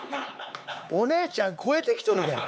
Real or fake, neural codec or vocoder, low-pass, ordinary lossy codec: fake; codec, 16 kHz, 4 kbps, X-Codec, HuBERT features, trained on LibriSpeech; none; none